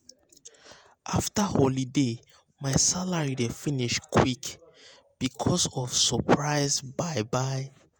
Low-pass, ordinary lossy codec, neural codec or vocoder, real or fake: none; none; vocoder, 48 kHz, 128 mel bands, Vocos; fake